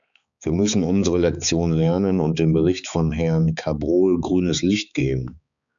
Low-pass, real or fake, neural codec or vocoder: 7.2 kHz; fake; codec, 16 kHz, 4 kbps, X-Codec, HuBERT features, trained on balanced general audio